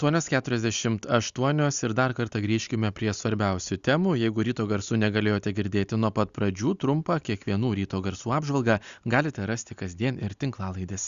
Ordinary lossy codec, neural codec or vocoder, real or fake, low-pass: Opus, 64 kbps; none; real; 7.2 kHz